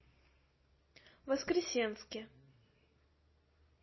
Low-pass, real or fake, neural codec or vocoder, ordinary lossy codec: 7.2 kHz; real; none; MP3, 24 kbps